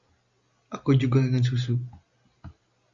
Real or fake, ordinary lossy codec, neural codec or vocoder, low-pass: real; Opus, 64 kbps; none; 7.2 kHz